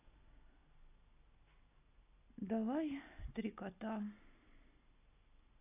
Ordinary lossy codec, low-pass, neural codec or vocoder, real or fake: AAC, 32 kbps; 3.6 kHz; none; real